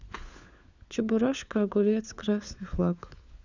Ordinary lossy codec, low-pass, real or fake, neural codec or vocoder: Opus, 64 kbps; 7.2 kHz; fake; codec, 16 kHz, 4 kbps, FunCodec, trained on LibriTTS, 50 frames a second